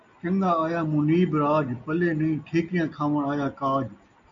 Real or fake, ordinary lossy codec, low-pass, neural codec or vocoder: real; MP3, 96 kbps; 7.2 kHz; none